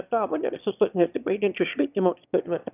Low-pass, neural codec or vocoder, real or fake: 3.6 kHz; autoencoder, 22.05 kHz, a latent of 192 numbers a frame, VITS, trained on one speaker; fake